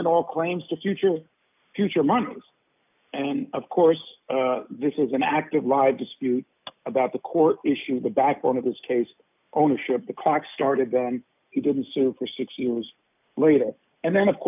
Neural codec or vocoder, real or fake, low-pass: none; real; 3.6 kHz